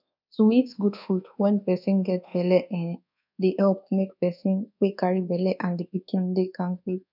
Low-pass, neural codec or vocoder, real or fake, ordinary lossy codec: 5.4 kHz; codec, 24 kHz, 1.2 kbps, DualCodec; fake; none